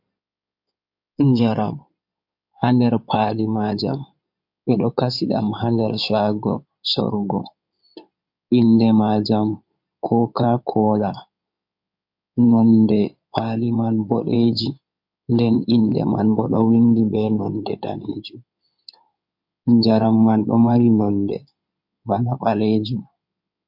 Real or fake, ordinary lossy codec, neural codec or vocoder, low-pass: fake; MP3, 48 kbps; codec, 16 kHz in and 24 kHz out, 2.2 kbps, FireRedTTS-2 codec; 5.4 kHz